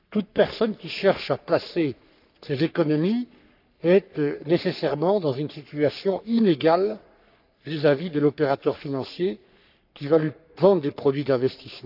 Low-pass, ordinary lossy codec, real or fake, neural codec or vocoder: 5.4 kHz; none; fake; codec, 44.1 kHz, 3.4 kbps, Pupu-Codec